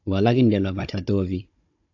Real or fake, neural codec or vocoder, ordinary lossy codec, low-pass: fake; codec, 16 kHz, 16 kbps, FunCodec, trained on Chinese and English, 50 frames a second; AAC, 48 kbps; 7.2 kHz